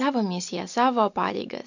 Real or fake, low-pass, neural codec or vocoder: real; 7.2 kHz; none